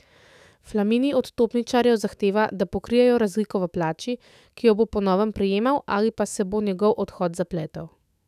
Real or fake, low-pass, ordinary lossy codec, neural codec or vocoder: fake; 14.4 kHz; none; autoencoder, 48 kHz, 128 numbers a frame, DAC-VAE, trained on Japanese speech